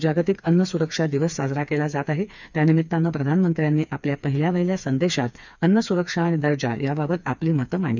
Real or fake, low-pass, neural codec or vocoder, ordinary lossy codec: fake; 7.2 kHz; codec, 16 kHz, 4 kbps, FreqCodec, smaller model; none